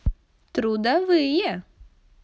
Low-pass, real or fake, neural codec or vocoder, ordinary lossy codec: none; real; none; none